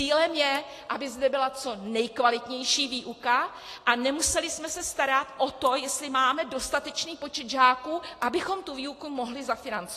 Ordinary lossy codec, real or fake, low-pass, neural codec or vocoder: AAC, 48 kbps; real; 14.4 kHz; none